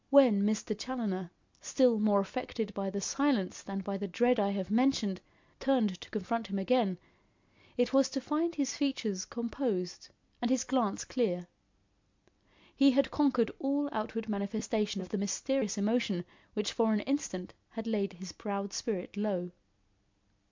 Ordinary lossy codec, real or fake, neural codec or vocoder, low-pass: MP3, 64 kbps; real; none; 7.2 kHz